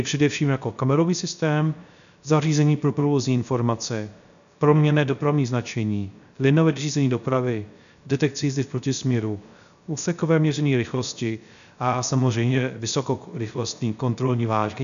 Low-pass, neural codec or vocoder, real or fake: 7.2 kHz; codec, 16 kHz, 0.3 kbps, FocalCodec; fake